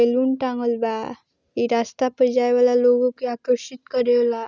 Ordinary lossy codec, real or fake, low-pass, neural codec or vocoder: none; real; 7.2 kHz; none